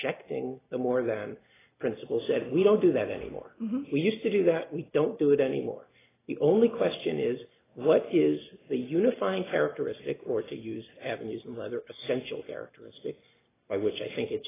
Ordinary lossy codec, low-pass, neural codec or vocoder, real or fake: AAC, 16 kbps; 3.6 kHz; none; real